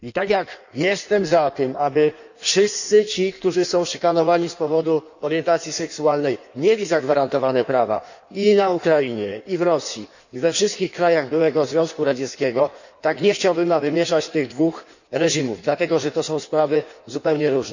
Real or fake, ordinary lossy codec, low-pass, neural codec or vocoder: fake; none; 7.2 kHz; codec, 16 kHz in and 24 kHz out, 1.1 kbps, FireRedTTS-2 codec